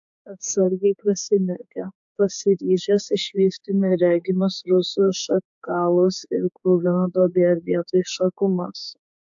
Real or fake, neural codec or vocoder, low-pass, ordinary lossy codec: fake; codec, 16 kHz, 4 kbps, X-Codec, HuBERT features, trained on general audio; 7.2 kHz; AAC, 64 kbps